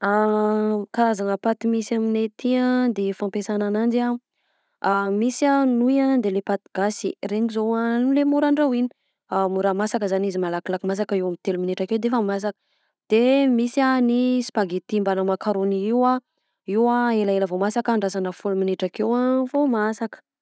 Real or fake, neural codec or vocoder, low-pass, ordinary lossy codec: real; none; none; none